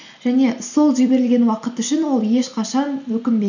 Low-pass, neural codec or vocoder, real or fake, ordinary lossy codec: 7.2 kHz; none; real; none